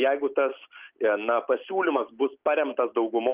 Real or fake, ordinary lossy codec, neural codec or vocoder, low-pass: real; Opus, 32 kbps; none; 3.6 kHz